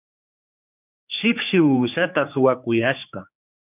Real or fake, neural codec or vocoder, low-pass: fake; codec, 16 kHz, 4 kbps, X-Codec, HuBERT features, trained on general audio; 3.6 kHz